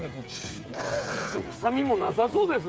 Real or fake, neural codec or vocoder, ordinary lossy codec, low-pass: fake; codec, 16 kHz, 8 kbps, FreqCodec, smaller model; none; none